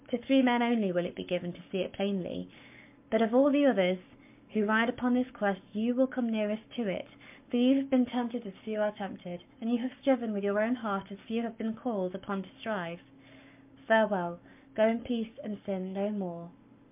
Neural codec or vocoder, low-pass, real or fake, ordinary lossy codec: codec, 44.1 kHz, 7.8 kbps, Pupu-Codec; 3.6 kHz; fake; MP3, 32 kbps